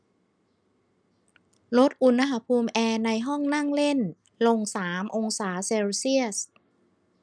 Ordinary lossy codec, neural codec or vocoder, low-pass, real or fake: none; none; 9.9 kHz; real